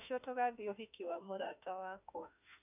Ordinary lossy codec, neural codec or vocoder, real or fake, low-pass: none; autoencoder, 48 kHz, 32 numbers a frame, DAC-VAE, trained on Japanese speech; fake; 3.6 kHz